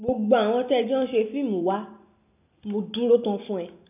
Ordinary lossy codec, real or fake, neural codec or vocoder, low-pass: none; real; none; 3.6 kHz